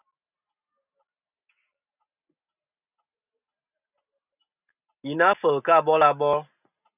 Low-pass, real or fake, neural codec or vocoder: 3.6 kHz; real; none